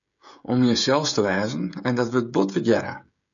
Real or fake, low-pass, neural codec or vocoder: fake; 7.2 kHz; codec, 16 kHz, 16 kbps, FreqCodec, smaller model